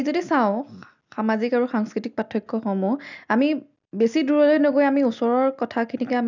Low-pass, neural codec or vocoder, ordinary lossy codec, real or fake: 7.2 kHz; none; none; real